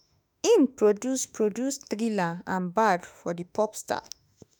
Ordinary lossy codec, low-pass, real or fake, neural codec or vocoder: none; none; fake; autoencoder, 48 kHz, 32 numbers a frame, DAC-VAE, trained on Japanese speech